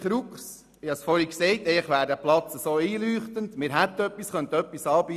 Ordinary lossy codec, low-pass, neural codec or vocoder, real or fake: none; 14.4 kHz; none; real